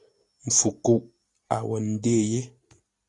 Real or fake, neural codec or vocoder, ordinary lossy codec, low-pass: real; none; AAC, 48 kbps; 10.8 kHz